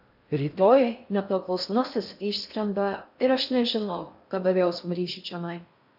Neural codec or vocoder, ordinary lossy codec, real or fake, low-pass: codec, 16 kHz in and 24 kHz out, 0.6 kbps, FocalCodec, streaming, 4096 codes; AAC, 48 kbps; fake; 5.4 kHz